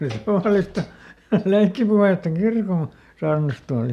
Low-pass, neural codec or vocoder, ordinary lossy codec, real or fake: 14.4 kHz; none; MP3, 96 kbps; real